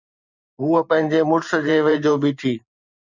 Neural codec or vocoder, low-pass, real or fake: vocoder, 44.1 kHz, 128 mel bands every 512 samples, BigVGAN v2; 7.2 kHz; fake